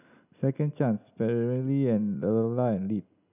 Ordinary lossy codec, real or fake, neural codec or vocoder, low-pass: none; real; none; 3.6 kHz